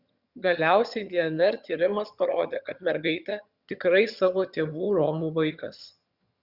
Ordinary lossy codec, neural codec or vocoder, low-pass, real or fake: Opus, 64 kbps; vocoder, 22.05 kHz, 80 mel bands, HiFi-GAN; 5.4 kHz; fake